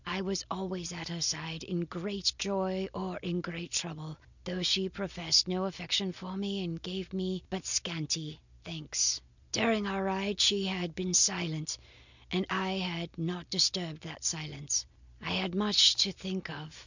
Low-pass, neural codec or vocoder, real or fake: 7.2 kHz; none; real